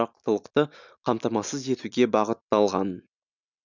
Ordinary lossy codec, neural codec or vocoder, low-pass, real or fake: none; none; 7.2 kHz; real